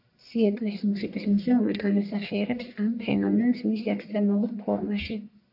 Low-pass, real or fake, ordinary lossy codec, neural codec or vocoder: 5.4 kHz; fake; AAC, 48 kbps; codec, 44.1 kHz, 1.7 kbps, Pupu-Codec